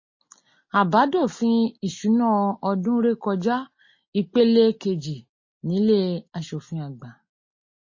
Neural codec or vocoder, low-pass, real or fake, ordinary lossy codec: none; 7.2 kHz; real; MP3, 32 kbps